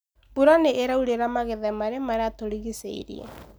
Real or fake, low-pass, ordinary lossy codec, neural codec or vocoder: real; none; none; none